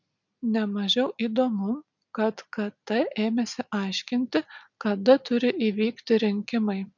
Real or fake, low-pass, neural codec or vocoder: real; 7.2 kHz; none